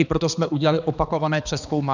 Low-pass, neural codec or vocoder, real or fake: 7.2 kHz; codec, 16 kHz, 2 kbps, X-Codec, HuBERT features, trained on balanced general audio; fake